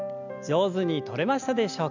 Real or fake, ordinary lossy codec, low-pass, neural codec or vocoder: real; none; 7.2 kHz; none